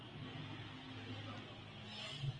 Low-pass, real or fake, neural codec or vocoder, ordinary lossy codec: 9.9 kHz; real; none; Opus, 24 kbps